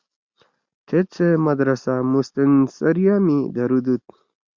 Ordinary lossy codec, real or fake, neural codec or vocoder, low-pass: Opus, 64 kbps; real; none; 7.2 kHz